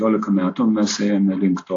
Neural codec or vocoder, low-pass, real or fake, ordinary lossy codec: none; 7.2 kHz; real; AAC, 48 kbps